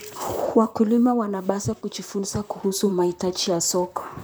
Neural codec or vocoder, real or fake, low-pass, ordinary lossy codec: vocoder, 44.1 kHz, 128 mel bands, Pupu-Vocoder; fake; none; none